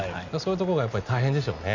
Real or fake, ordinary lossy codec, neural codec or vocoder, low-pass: real; none; none; 7.2 kHz